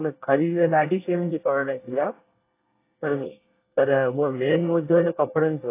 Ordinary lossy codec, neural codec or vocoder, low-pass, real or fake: AAC, 24 kbps; codec, 24 kHz, 1 kbps, SNAC; 3.6 kHz; fake